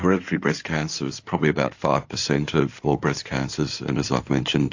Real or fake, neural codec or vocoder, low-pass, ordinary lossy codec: fake; codec, 16 kHz in and 24 kHz out, 2.2 kbps, FireRedTTS-2 codec; 7.2 kHz; AAC, 48 kbps